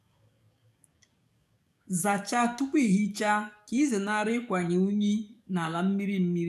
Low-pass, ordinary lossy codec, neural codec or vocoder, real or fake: 14.4 kHz; none; codec, 44.1 kHz, 7.8 kbps, DAC; fake